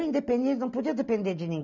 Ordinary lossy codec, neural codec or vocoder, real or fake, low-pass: none; none; real; 7.2 kHz